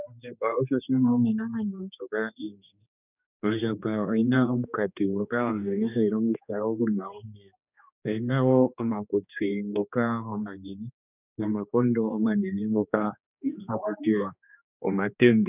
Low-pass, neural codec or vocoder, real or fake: 3.6 kHz; codec, 16 kHz, 2 kbps, X-Codec, HuBERT features, trained on general audio; fake